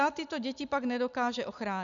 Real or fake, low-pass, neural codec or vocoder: real; 7.2 kHz; none